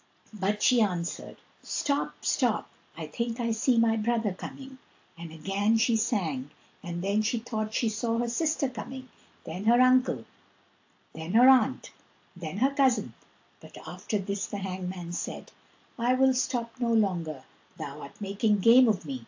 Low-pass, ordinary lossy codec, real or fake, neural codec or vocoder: 7.2 kHz; AAC, 48 kbps; real; none